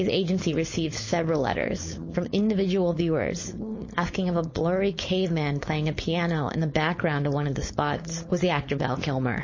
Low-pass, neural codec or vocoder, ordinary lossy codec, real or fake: 7.2 kHz; codec, 16 kHz, 4.8 kbps, FACodec; MP3, 32 kbps; fake